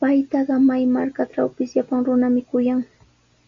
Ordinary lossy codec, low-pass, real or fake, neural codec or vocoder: MP3, 48 kbps; 7.2 kHz; real; none